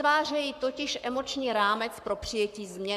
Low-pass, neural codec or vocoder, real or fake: 14.4 kHz; codec, 44.1 kHz, 7.8 kbps, Pupu-Codec; fake